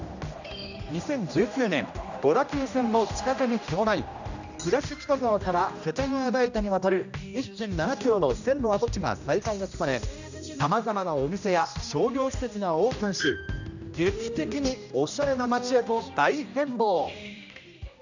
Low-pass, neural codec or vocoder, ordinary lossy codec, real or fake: 7.2 kHz; codec, 16 kHz, 1 kbps, X-Codec, HuBERT features, trained on general audio; none; fake